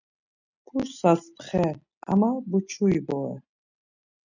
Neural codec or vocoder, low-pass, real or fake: none; 7.2 kHz; real